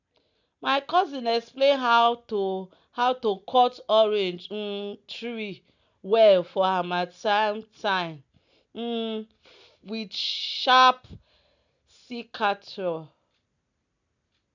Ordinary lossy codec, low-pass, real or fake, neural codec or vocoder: none; 7.2 kHz; real; none